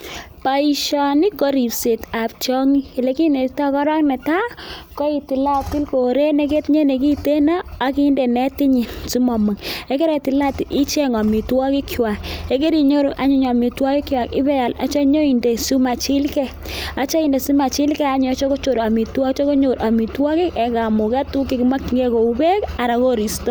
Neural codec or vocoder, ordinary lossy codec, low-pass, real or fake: none; none; none; real